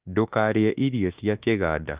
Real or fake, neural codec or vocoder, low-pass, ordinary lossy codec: fake; autoencoder, 48 kHz, 32 numbers a frame, DAC-VAE, trained on Japanese speech; 3.6 kHz; Opus, 24 kbps